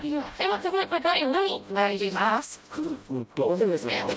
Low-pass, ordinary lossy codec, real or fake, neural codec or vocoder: none; none; fake; codec, 16 kHz, 0.5 kbps, FreqCodec, smaller model